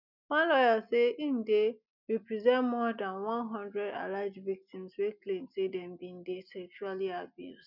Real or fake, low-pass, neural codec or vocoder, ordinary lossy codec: real; 5.4 kHz; none; none